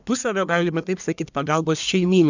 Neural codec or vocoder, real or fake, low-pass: codec, 44.1 kHz, 1.7 kbps, Pupu-Codec; fake; 7.2 kHz